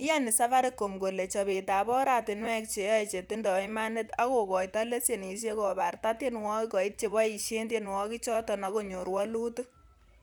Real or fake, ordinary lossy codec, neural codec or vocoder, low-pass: fake; none; vocoder, 44.1 kHz, 128 mel bands, Pupu-Vocoder; none